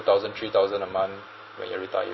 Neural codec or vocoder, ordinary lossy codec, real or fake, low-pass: none; MP3, 24 kbps; real; 7.2 kHz